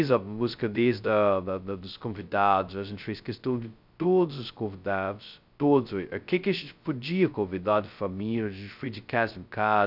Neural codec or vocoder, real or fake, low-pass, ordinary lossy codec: codec, 16 kHz, 0.2 kbps, FocalCodec; fake; 5.4 kHz; none